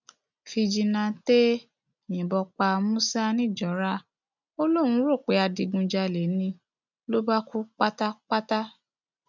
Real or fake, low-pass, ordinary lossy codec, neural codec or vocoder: real; 7.2 kHz; none; none